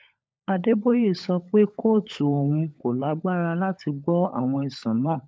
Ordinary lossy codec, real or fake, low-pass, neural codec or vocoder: none; fake; none; codec, 16 kHz, 16 kbps, FunCodec, trained on LibriTTS, 50 frames a second